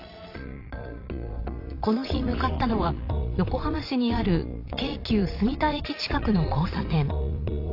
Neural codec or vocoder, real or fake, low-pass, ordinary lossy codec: vocoder, 22.05 kHz, 80 mel bands, Vocos; fake; 5.4 kHz; AAC, 32 kbps